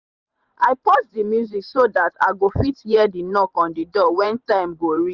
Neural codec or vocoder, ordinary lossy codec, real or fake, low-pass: vocoder, 44.1 kHz, 128 mel bands every 512 samples, BigVGAN v2; Opus, 64 kbps; fake; 7.2 kHz